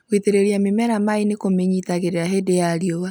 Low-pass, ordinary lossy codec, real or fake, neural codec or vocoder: none; none; real; none